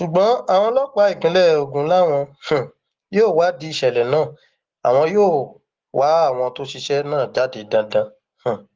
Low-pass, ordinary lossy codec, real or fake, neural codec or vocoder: 7.2 kHz; Opus, 16 kbps; real; none